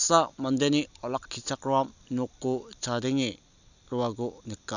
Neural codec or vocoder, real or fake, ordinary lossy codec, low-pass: vocoder, 44.1 kHz, 128 mel bands every 256 samples, BigVGAN v2; fake; none; 7.2 kHz